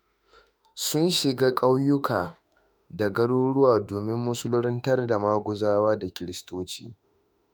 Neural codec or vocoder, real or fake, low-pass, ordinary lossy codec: autoencoder, 48 kHz, 32 numbers a frame, DAC-VAE, trained on Japanese speech; fake; none; none